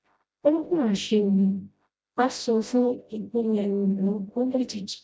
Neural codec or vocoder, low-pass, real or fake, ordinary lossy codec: codec, 16 kHz, 0.5 kbps, FreqCodec, smaller model; none; fake; none